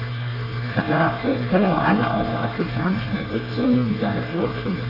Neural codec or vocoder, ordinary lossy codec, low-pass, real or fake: codec, 24 kHz, 1 kbps, SNAC; none; 5.4 kHz; fake